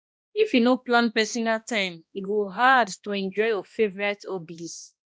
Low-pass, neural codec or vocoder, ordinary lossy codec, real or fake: none; codec, 16 kHz, 1 kbps, X-Codec, HuBERT features, trained on balanced general audio; none; fake